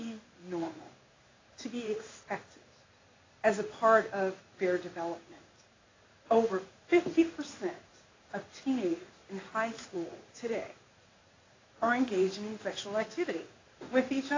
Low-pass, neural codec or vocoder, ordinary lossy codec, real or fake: 7.2 kHz; codec, 16 kHz in and 24 kHz out, 1 kbps, XY-Tokenizer; AAC, 32 kbps; fake